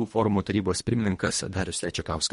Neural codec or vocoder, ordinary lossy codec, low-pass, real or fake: codec, 24 kHz, 1.5 kbps, HILCodec; MP3, 48 kbps; 10.8 kHz; fake